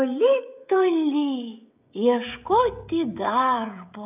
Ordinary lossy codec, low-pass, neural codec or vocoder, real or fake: AAC, 32 kbps; 3.6 kHz; codec, 16 kHz, 16 kbps, FreqCodec, smaller model; fake